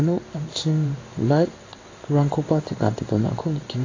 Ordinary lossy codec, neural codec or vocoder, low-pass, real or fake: AAC, 32 kbps; codec, 16 kHz in and 24 kHz out, 1 kbps, XY-Tokenizer; 7.2 kHz; fake